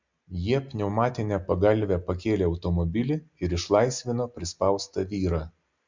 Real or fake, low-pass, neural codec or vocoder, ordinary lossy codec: real; 7.2 kHz; none; MP3, 64 kbps